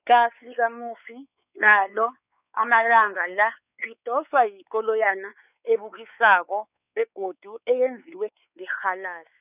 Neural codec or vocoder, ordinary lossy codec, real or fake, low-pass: codec, 16 kHz, 4 kbps, X-Codec, WavLM features, trained on Multilingual LibriSpeech; none; fake; 3.6 kHz